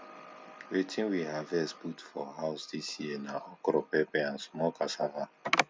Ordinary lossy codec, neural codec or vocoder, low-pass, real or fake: none; none; none; real